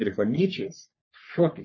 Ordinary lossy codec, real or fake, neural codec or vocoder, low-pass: MP3, 32 kbps; fake; codec, 44.1 kHz, 3.4 kbps, Pupu-Codec; 7.2 kHz